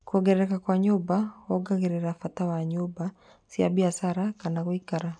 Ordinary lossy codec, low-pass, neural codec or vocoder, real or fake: none; 9.9 kHz; none; real